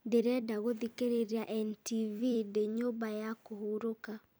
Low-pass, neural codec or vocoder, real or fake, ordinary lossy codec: none; vocoder, 44.1 kHz, 128 mel bands every 256 samples, BigVGAN v2; fake; none